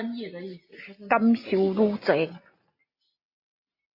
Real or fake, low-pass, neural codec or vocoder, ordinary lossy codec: real; 5.4 kHz; none; AAC, 32 kbps